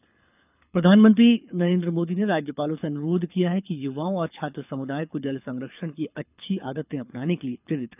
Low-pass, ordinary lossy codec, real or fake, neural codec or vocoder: 3.6 kHz; none; fake; codec, 24 kHz, 6 kbps, HILCodec